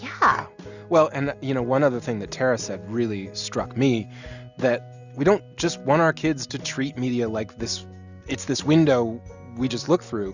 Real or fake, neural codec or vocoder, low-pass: real; none; 7.2 kHz